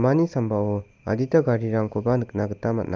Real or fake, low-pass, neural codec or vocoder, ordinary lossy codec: real; 7.2 kHz; none; Opus, 32 kbps